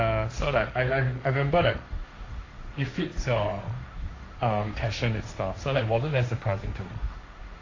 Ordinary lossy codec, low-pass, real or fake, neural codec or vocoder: AAC, 32 kbps; 7.2 kHz; fake; codec, 16 kHz, 1.1 kbps, Voila-Tokenizer